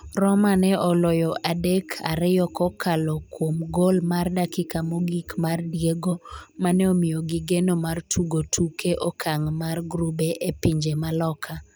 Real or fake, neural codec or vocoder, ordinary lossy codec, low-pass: real; none; none; none